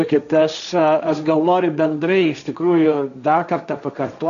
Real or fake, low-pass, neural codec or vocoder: fake; 7.2 kHz; codec, 16 kHz, 1.1 kbps, Voila-Tokenizer